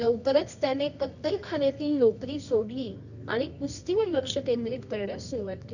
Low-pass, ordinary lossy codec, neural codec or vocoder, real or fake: 7.2 kHz; none; codec, 24 kHz, 0.9 kbps, WavTokenizer, medium music audio release; fake